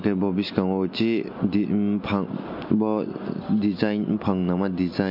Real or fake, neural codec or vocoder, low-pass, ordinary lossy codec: real; none; 5.4 kHz; MP3, 32 kbps